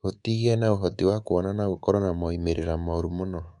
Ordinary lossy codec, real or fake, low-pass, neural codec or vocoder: none; fake; 10.8 kHz; codec, 24 kHz, 3.1 kbps, DualCodec